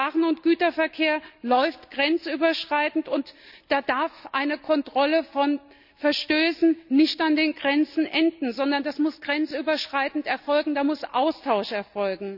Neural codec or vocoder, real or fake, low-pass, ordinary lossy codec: none; real; 5.4 kHz; none